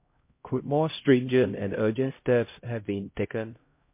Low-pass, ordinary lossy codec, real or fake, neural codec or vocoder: 3.6 kHz; MP3, 24 kbps; fake; codec, 16 kHz, 0.5 kbps, X-Codec, HuBERT features, trained on LibriSpeech